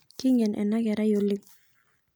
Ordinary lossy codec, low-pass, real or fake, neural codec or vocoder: none; none; real; none